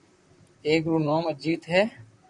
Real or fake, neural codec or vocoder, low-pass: fake; vocoder, 44.1 kHz, 128 mel bands, Pupu-Vocoder; 10.8 kHz